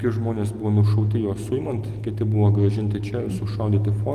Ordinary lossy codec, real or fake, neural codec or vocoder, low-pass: Opus, 24 kbps; real; none; 14.4 kHz